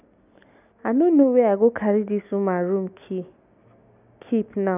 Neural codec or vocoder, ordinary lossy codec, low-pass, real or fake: none; none; 3.6 kHz; real